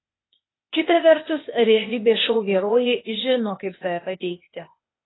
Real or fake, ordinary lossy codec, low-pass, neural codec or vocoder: fake; AAC, 16 kbps; 7.2 kHz; codec, 16 kHz, 0.8 kbps, ZipCodec